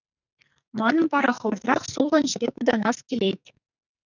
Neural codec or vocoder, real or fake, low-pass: codec, 44.1 kHz, 2.6 kbps, SNAC; fake; 7.2 kHz